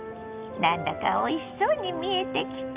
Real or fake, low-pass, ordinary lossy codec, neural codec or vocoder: real; 3.6 kHz; Opus, 64 kbps; none